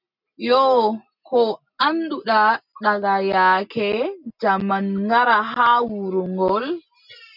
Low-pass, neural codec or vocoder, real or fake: 5.4 kHz; none; real